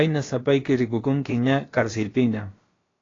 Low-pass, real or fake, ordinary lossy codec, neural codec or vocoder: 7.2 kHz; fake; AAC, 32 kbps; codec, 16 kHz, about 1 kbps, DyCAST, with the encoder's durations